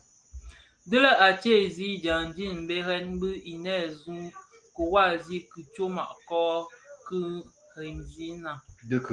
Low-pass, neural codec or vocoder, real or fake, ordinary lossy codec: 9.9 kHz; none; real; Opus, 24 kbps